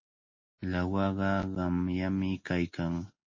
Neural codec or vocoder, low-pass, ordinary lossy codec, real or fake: none; 7.2 kHz; MP3, 32 kbps; real